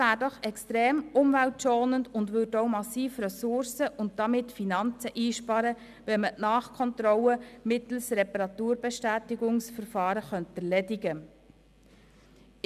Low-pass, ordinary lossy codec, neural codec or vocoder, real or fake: 14.4 kHz; none; none; real